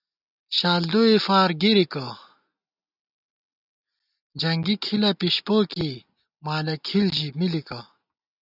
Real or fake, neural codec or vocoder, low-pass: real; none; 5.4 kHz